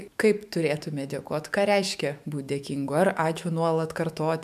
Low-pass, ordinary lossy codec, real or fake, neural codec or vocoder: 14.4 kHz; MP3, 96 kbps; fake; autoencoder, 48 kHz, 128 numbers a frame, DAC-VAE, trained on Japanese speech